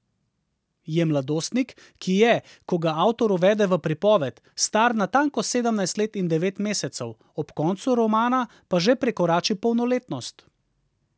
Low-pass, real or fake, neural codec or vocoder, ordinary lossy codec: none; real; none; none